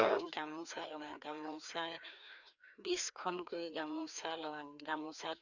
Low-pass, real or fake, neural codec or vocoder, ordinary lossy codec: 7.2 kHz; fake; codec, 16 kHz, 2 kbps, FreqCodec, larger model; none